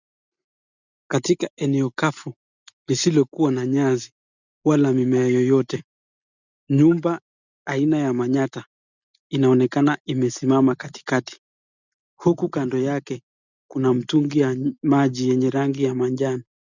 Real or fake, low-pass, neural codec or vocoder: real; 7.2 kHz; none